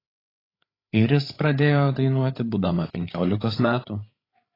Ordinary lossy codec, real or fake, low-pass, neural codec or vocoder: AAC, 24 kbps; fake; 5.4 kHz; codec, 16 kHz, 8 kbps, FreqCodec, larger model